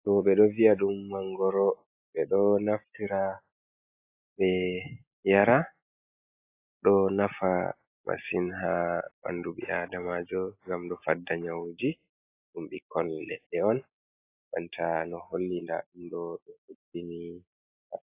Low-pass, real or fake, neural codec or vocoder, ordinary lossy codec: 3.6 kHz; real; none; AAC, 24 kbps